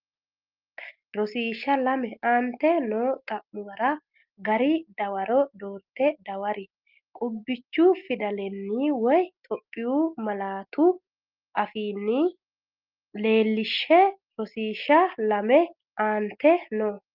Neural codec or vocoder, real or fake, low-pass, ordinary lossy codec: none; real; 5.4 kHz; Opus, 32 kbps